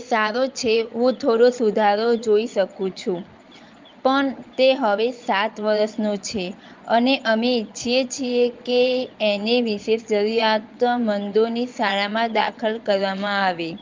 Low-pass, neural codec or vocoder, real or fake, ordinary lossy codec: 7.2 kHz; vocoder, 22.05 kHz, 80 mel bands, Vocos; fake; Opus, 24 kbps